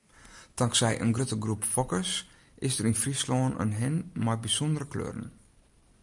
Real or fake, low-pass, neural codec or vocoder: real; 10.8 kHz; none